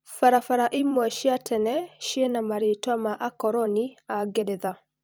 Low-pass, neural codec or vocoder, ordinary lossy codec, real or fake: none; vocoder, 44.1 kHz, 128 mel bands every 256 samples, BigVGAN v2; none; fake